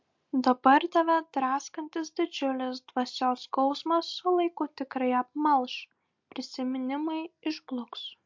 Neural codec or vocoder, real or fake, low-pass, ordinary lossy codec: none; real; 7.2 kHz; MP3, 48 kbps